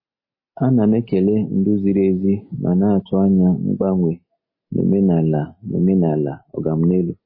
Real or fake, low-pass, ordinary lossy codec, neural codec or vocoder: real; 5.4 kHz; MP3, 24 kbps; none